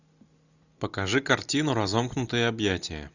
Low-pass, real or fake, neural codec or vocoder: 7.2 kHz; real; none